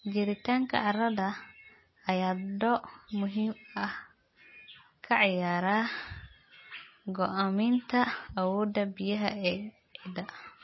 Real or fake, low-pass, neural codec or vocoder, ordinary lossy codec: real; 7.2 kHz; none; MP3, 24 kbps